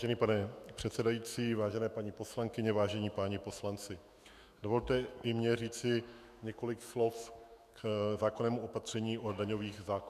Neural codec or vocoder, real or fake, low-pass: autoencoder, 48 kHz, 128 numbers a frame, DAC-VAE, trained on Japanese speech; fake; 14.4 kHz